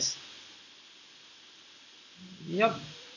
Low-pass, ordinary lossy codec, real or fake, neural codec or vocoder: 7.2 kHz; none; real; none